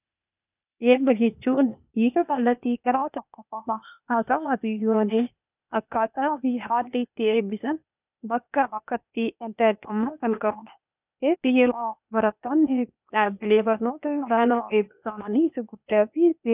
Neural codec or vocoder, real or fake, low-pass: codec, 16 kHz, 0.8 kbps, ZipCodec; fake; 3.6 kHz